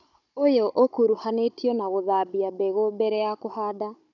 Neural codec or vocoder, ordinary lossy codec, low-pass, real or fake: codec, 16 kHz, 16 kbps, FunCodec, trained on Chinese and English, 50 frames a second; none; 7.2 kHz; fake